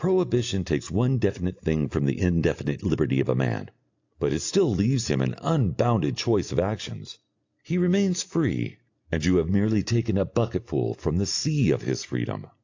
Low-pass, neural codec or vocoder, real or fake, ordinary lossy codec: 7.2 kHz; none; real; AAC, 48 kbps